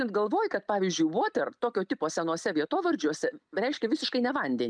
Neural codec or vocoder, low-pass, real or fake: none; 9.9 kHz; real